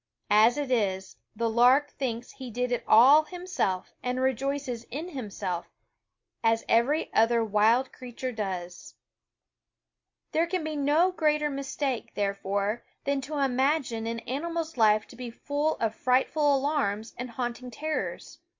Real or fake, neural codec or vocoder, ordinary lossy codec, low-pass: real; none; MP3, 48 kbps; 7.2 kHz